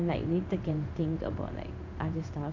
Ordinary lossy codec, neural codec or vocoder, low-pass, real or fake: AAC, 48 kbps; codec, 16 kHz in and 24 kHz out, 1 kbps, XY-Tokenizer; 7.2 kHz; fake